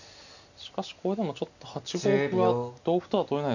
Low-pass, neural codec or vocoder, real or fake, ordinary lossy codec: 7.2 kHz; none; real; none